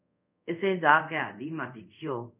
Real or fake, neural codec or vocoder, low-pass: fake; codec, 24 kHz, 0.5 kbps, DualCodec; 3.6 kHz